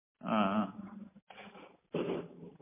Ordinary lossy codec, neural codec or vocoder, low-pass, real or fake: MP3, 24 kbps; none; 3.6 kHz; real